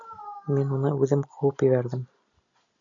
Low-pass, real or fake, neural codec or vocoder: 7.2 kHz; real; none